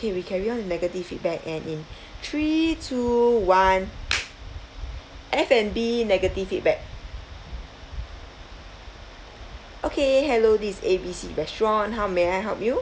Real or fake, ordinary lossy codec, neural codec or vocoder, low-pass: real; none; none; none